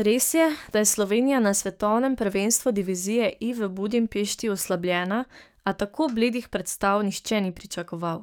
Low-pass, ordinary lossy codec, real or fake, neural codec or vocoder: none; none; fake; codec, 44.1 kHz, 7.8 kbps, DAC